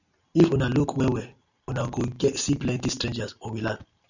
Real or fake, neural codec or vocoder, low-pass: real; none; 7.2 kHz